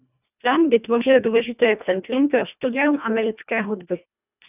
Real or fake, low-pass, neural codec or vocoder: fake; 3.6 kHz; codec, 24 kHz, 1.5 kbps, HILCodec